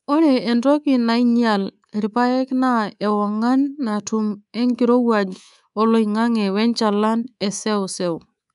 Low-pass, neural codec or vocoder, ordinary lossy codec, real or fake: 10.8 kHz; codec, 24 kHz, 3.1 kbps, DualCodec; none; fake